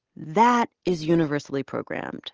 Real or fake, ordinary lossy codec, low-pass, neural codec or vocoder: real; Opus, 16 kbps; 7.2 kHz; none